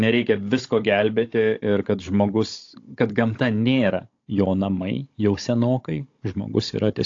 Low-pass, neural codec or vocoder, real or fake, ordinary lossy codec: 7.2 kHz; codec, 16 kHz, 16 kbps, FunCodec, trained on Chinese and English, 50 frames a second; fake; AAC, 48 kbps